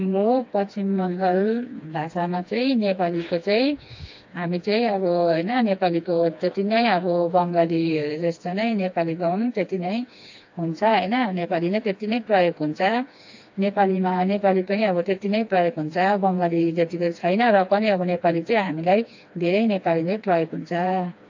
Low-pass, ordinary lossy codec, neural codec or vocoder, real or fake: 7.2 kHz; AAC, 48 kbps; codec, 16 kHz, 2 kbps, FreqCodec, smaller model; fake